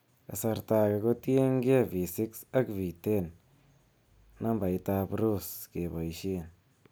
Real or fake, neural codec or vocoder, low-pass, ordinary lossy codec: real; none; none; none